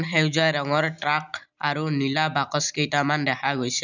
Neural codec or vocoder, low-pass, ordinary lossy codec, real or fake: none; 7.2 kHz; none; real